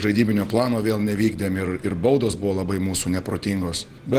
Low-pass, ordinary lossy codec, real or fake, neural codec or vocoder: 14.4 kHz; Opus, 16 kbps; real; none